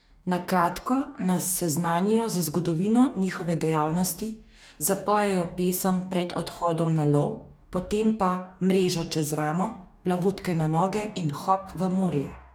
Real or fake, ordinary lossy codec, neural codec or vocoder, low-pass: fake; none; codec, 44.1 kHz, 2.6 kbps, DAC; none